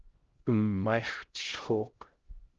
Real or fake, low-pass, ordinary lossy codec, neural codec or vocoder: fake; 7.2 kHz; Opus, 16 kbps; codec, 16 kHz, 0.5 kbps, X-Codec, HuBERT features, trained on LibriSpeech